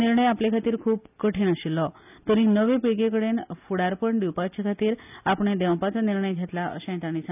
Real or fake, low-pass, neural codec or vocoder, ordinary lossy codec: real; 3.6 kHz; none; none